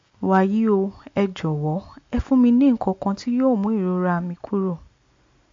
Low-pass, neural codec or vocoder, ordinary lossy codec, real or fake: 7.2 kHz; none; MP3, 48 kbps; real